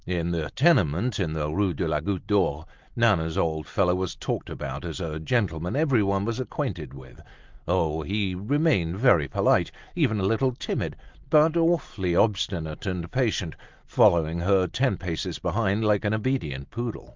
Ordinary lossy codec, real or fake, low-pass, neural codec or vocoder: Opus, 32 kbps; real; 7.2 kHz; none